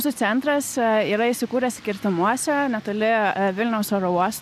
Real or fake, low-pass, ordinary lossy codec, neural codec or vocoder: real; 14.4 kHz; AAC, 96 kbps; none